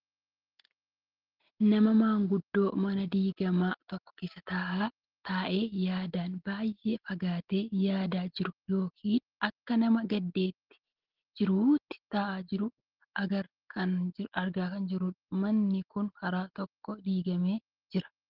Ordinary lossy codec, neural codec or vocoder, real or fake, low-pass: Opus, 16 kbps; none; real; 5.4 kHz